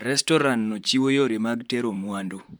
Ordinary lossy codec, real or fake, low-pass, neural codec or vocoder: none; fake; none; vocoder, 44.1 kHz, 128 mel bands, Pupu-Vocoder